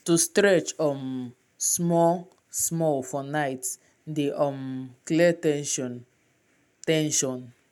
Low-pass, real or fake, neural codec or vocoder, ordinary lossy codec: 19.8 kHz; real; none; none